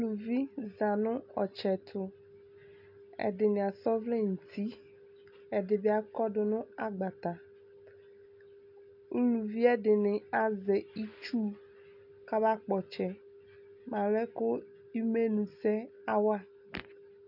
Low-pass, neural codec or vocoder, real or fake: 5.4 kHz; none; real